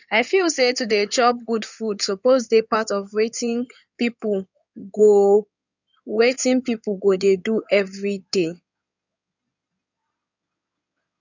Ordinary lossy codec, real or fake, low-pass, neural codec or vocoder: none; fake; 7.2 kHz; codec, 16 kHz in and 24 kHz out, 2.2 kbps, FireRedTTS-2 codec